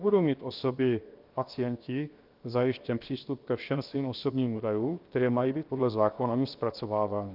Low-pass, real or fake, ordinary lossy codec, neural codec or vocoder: 5.4 kHz; fake; Opus, 32 kbps; codec, 16 kHz, 0.7 kbps, FocalCodec